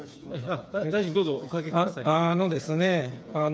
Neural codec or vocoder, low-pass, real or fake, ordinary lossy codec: codec, 16 kHz, 8 kbps, FreqCodec, smaller model; none; fake; none